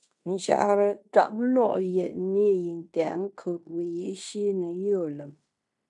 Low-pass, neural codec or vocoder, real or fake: 10.8 kHz; codec, 16 kHz in and 24 kHz out, 0.9 kbps, LongCat-Audio-Codec, fine tuned four codebook decoder; fake